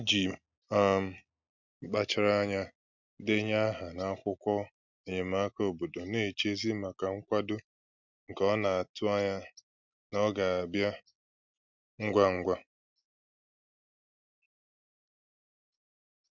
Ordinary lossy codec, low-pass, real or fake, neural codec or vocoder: none; 7.2 kHz; real; none